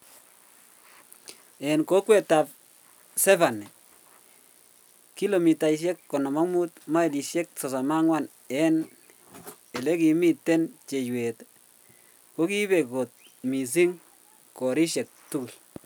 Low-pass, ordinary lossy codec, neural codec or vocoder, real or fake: none; none; none; real